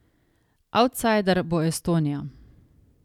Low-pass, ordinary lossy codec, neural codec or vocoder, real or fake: 19.8 kHz; none; none; real